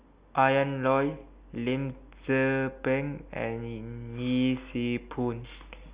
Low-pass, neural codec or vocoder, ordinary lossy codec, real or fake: 3.6 kHz; none; Opus, 64 kbps; real